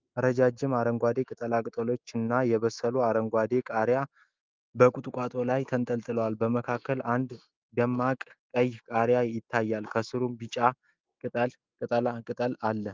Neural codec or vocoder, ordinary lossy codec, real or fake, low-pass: autoencoder, 48 kHz, 128 numbers a frame, DAC-VAE, trained on Japanese speech; Opus, 24 kbps; fake; 7.2 kHz